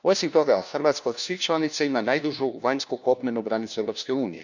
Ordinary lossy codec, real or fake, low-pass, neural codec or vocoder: none; fake; 7.2 kHz; codec, 16 kHz, 1 kbps, FunCodec, trained on LibriTTS, 50 frames a second